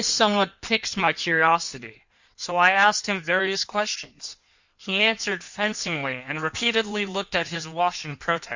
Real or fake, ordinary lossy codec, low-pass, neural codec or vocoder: fake; Opus, 64 kbps; 7.2 kHz; codec, 16 kHz in and 24 kHz out, 1.1 kbps, FireRedTTS-2 codec